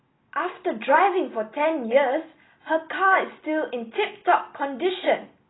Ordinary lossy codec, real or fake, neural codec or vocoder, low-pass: AAC, 16 kbps; real; none; 7.2 kHz